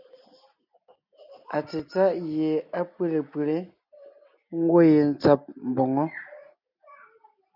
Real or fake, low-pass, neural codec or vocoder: real; 5.4 kHz; none